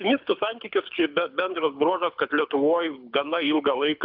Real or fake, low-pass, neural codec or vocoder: fake; 5.4 kHz; codec, 24 kHz, 6 kbps, HILCodec